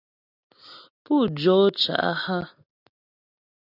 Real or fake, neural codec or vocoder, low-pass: real; none; 5.4 kHz